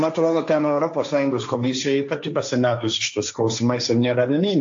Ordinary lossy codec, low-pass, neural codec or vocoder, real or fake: AAC, 64 kbps; 7.2 kHz; codec, 16 kHz, 1.1 kbps, Voila-Tokenizer; fake